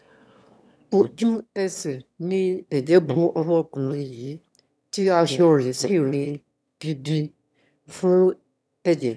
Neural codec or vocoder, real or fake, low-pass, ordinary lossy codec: autoencoder, 22.05 kHz, a latent of 192 numbers a frame, VITS, trained on one speaker; fake; none; none